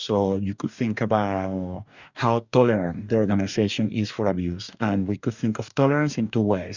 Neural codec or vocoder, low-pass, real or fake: codec, 44.1 kHz, 2.6 kbps, DAC; 7.2 kHz; fake